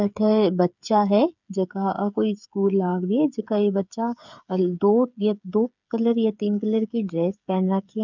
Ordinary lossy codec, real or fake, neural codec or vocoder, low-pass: none; fake; codec, 16 kHz, 8 kbps, FreqCodec, smaller model; 7.2 kHz